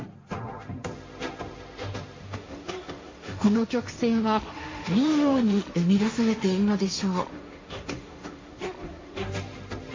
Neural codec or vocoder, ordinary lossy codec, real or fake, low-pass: codec, 16 kHz, 1.1 kbps, Voila-Tokenizer; MP3, 32 kbps; fake; 7.2 kHz